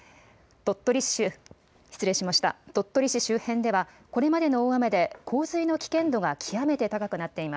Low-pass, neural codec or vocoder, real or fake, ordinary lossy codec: none; none; real; none